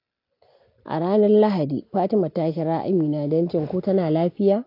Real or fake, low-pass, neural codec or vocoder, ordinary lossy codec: real; 5.4 kHz; none; AAC, 32 kbps